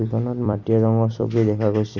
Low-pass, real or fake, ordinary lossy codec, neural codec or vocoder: 7.2 kHz; fake; none; vocoder, 44.1 kHz, 128 mel bands every 256 samples, BigVGAN v2